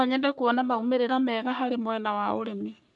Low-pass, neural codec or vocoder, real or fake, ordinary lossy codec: 10.8 kHz; codec, 44.1 kHz, 3.4 kbps, Pupu-Codec; fake; none